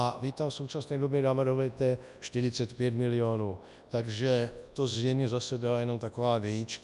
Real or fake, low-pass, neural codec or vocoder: fake; 10.8 kHz; codec, 24 kHz, 0.9 kbps, WavTokenizer, large speech release